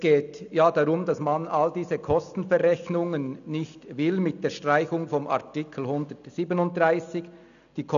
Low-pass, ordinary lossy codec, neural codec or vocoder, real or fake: 7.2 kHz; none; none; real